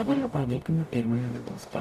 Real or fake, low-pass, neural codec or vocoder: fake; 14.4 kHz; codec, 44.1 kHz, 0.9 kbps, DAC